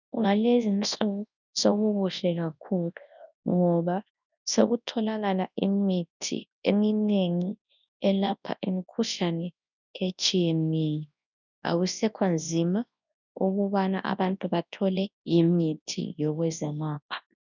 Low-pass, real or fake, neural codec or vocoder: 7.2 kHz; fake; codec, 24 kHz, 0.9 kbps, WavTokenizer, large speech release